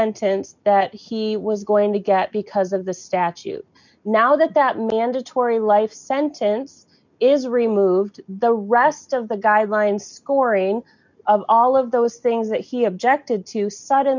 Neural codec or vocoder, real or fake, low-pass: none; real; 7.2 kHz